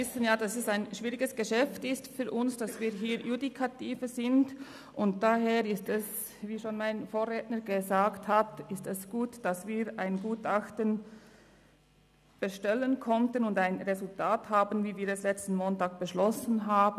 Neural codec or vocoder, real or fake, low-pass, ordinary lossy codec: none; real; 14.4 kHz; none